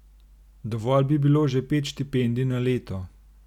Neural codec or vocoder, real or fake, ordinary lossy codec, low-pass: none; real; none; 19.8 kHz